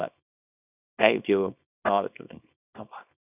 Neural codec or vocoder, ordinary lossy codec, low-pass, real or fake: codec, 24 kHz, 0.9 kbps, WavTokenizer, small release; none; 3.6 kHz; fake